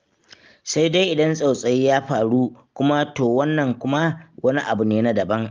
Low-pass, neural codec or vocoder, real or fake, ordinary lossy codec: 7.2 kHz; none; real; Opus, 24 kbps